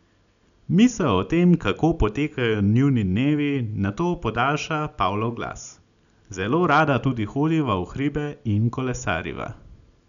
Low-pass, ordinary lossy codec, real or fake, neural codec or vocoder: 7.2 kHz; none; real; none